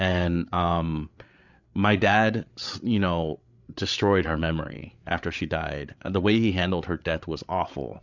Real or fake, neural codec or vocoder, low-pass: fake; codec, 16 kHz, 8 kbps, FreqCodec, larger model; 7.2 kHz